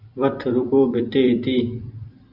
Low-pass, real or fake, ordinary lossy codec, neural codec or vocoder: 5.4 kHz; fake; AAC, 48 kbps; vocoder, 44.1 kHz, 128 mel bands every 256 samples, BigVGAN v2